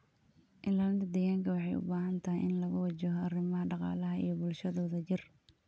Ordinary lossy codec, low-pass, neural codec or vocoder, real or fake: none; none; none; real